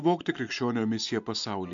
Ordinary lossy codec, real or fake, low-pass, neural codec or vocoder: MP3, 64 kbps; real; 7.2 kHz; none